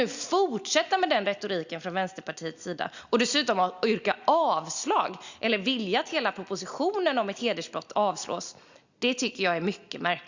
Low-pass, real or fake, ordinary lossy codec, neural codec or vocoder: 7.2 kHz; real; Opus, 64 kbps; none